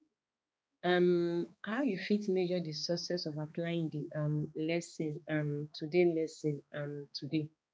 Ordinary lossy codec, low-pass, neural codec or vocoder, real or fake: none; none; codec, 16 kHz, 2 kbps, X-Codec, HuBERT features, trained on balanced general audio; fake